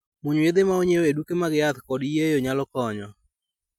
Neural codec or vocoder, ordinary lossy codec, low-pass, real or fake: none; MP3, 96 kbps; 19.8 kHz; real